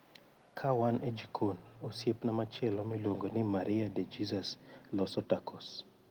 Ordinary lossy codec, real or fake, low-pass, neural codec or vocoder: Opus, 24 kbps; real; 19.8 kHz; none